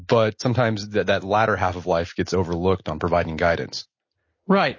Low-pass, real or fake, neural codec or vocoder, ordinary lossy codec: 7.2 kHz; real; none; MP3, 32 kbps